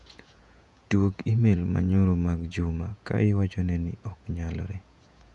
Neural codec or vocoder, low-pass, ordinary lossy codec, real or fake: vocoder, 48 kHz, 128 mel bands, Vocos; 10.8 kHz; none; fake